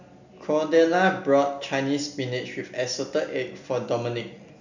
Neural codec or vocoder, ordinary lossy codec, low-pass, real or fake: none; none; 7.2 kHz; real